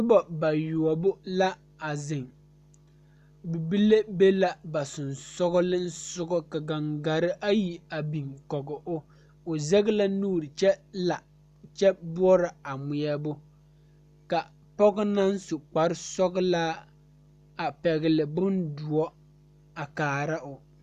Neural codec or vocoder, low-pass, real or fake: none; 14.4 kHz; real